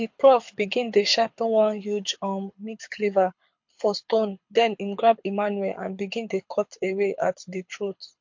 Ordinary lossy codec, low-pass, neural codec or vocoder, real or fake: MP3, 48 kbps; 7.2 kHz; codec, 24 kHz, 6 kbps, HILCodec; fake